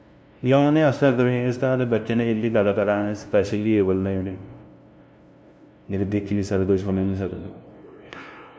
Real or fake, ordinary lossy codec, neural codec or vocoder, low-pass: fake; none; codec, 16 kHz, 0.5 kbps, FunCodec, trained on LibriTTS, 25 frames a second; none